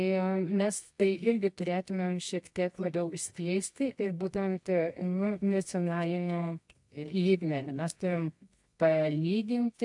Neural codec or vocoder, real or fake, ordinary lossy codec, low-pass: codec, 24 kHz, 0.9 kbps, WavTokenizer, medium music audio release; fake; AAC, 64 kbps; 10.8 kHz